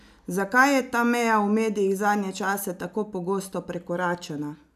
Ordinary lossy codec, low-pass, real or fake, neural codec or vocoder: none; 14.4 kHz; real; none